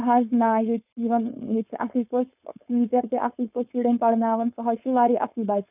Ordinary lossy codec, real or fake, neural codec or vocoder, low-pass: none; fake; codec, 16 kHz, 4.8 kbps, FACodec; 3.6 kHz